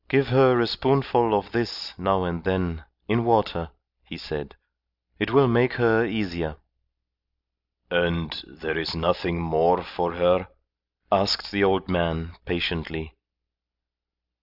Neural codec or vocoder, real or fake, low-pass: none; real; 5.4 kHz